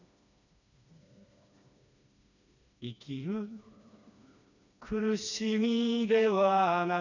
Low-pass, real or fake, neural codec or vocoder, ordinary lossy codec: 7.2 kHz; fake; codec, 16 kHz, 2 kbps, FreqCodec, smaller model; none